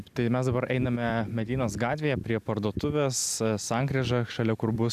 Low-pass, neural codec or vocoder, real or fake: 14.4 kHz; none; real